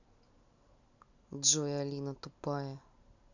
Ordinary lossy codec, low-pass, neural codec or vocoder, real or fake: none; 7.2 kHz; none; real